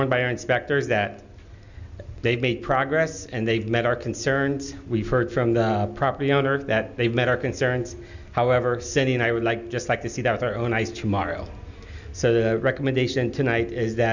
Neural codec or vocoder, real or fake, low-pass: none; real; 7.2 kHz